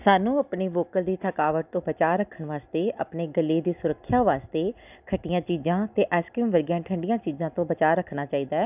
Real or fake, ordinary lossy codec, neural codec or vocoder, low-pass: real; none; none; 3.6 kHz